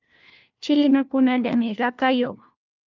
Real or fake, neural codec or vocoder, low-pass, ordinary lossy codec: fake; codec, 16 kHz, 1 kbps, FunCodec, trained on LibriTTS, 50 frames a second; 7.2 kHz; Opus, 24 kbps